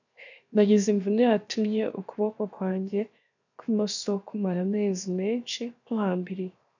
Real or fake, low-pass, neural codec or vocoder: fake; 7.2 kHz; codec, 16 kHz, 0.7 kbps, FocalCodec